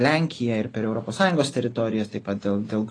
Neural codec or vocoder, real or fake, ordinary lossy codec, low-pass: none; real; AAC, 32 kbps; 9.9 kHz